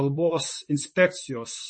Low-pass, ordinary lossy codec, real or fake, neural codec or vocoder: 9.9 kHz; MP3, 32 kbps; fake; vocoder, 44.1 kHz, 128 mel bands, Pupu-Vocoder